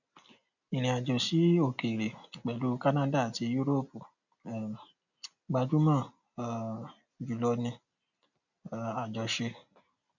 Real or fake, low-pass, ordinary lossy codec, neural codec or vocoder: real; 7.2 kHz; none; none